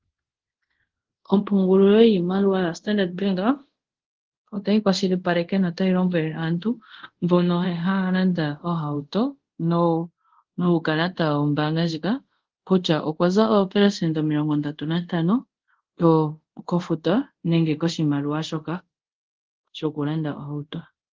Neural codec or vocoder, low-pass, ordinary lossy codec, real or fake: codec, 24 kHz, 0.5 kbps, DualCodec; 7.2 kHz; Opus, 16 kbps; fake